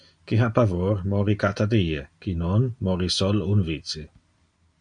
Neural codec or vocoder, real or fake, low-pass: none; real; 9.9 kHz